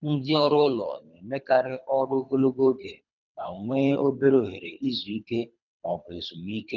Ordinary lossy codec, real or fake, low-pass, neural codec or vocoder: none; fake; 7.2 kHz; codec, 24 kHz, 3 kbps, HILCodec